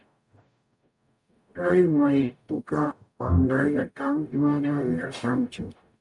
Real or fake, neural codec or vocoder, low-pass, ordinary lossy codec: fake; codec, 44.1 kHz, 0.9 kbps, DAC; 10.8 kHz; MP3, 96 kbps